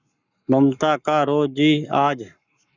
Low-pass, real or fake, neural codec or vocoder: 7.2 kHz; fake; codec, 44.1 kHz, 7.8 kbps, Pupu-Codec